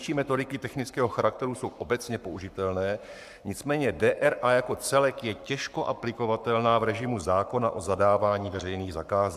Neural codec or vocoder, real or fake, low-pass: codec, 44.1 kHz, 7.8 kbps, Pupu-Codec; fake; 14.4 kHz